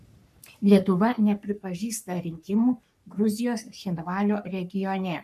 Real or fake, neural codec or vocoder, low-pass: fake; codec, 44.1 kHz, 3.4 kbps, Pupu-Codec; 14.4 kHz